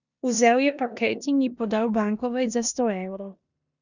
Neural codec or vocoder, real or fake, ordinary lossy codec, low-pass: codec, 16 kHz in and 24 kHz out, 0.9 kbps, LongCat-Audio-Codec, four codebook decoder; fake; none; 7.2 kHz